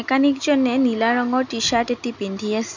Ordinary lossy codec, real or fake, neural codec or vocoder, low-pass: none; real; none; 7.2 kHz